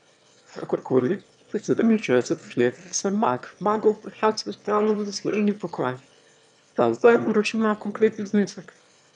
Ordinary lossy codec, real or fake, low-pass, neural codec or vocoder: none; fake; 9.9 kHz; autoencoder, 22.05 kHz, a latent of 192 numbers a frame, VITS, trained on one speaker